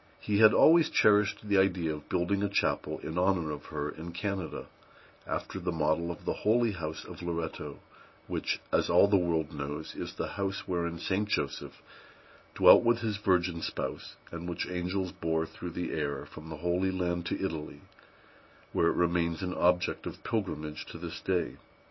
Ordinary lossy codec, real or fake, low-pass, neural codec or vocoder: MP3, 24 kbps; real; 7.2 kHz; none